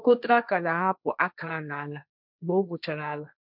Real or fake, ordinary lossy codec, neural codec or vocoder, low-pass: fake; none; codec, 16 kHz, 1.1 kbps, Voila-Tokenizer; 5.4 kHz